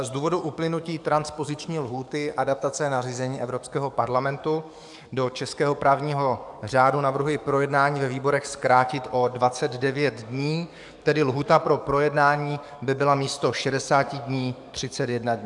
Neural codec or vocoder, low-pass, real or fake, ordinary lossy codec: codec, 44.1 kHz, 7.8 kbps, DAC; 10.8 kHz; fake; MP3, 96 kbps